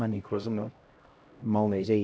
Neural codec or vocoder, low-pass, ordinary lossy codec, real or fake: codec, 16 kHz, 0.5 kbps, X-Codec, HuBERT features, trained on LibriSpeech; none; none; fake